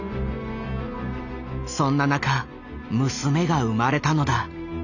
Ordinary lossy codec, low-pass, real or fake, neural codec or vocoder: none; 7.2 kHz; real; none